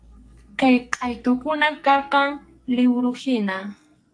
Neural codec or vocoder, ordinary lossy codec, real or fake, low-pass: codec, 44.1 kHz, 2.6 kbps, SNAC; AAC, 64 kbps; fake; 9.9 kHz